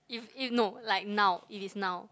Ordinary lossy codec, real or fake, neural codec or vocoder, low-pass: none; real; none; none